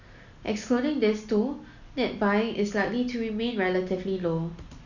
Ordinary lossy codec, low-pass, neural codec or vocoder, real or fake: none; 7.2 kHz; none; real